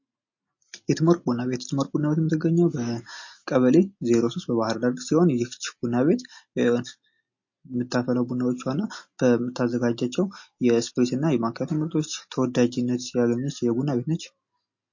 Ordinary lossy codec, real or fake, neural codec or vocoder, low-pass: MP3, 32 kbps; real; none; 7.2 kHz